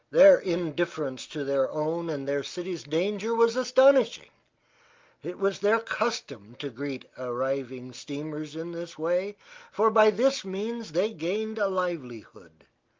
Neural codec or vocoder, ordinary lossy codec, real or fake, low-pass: none; Opus, 32 kbps; real; 7.2 kHz